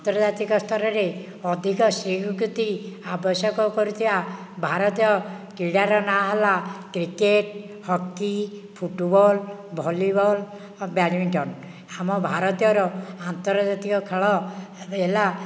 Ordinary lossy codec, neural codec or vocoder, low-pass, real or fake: none; none; none; real